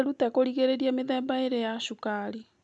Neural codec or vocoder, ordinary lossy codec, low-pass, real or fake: none; none; none; real